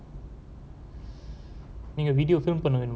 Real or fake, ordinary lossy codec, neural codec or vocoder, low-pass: real; none; none; none